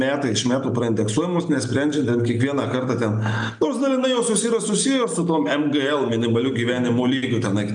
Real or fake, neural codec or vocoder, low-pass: real; none; 9.9 kHz